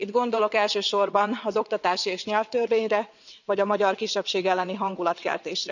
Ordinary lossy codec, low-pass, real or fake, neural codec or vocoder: none; 7.2 kHz; fake; vocoder, 22.05 kHz, 80 mel bands, WaveNeXt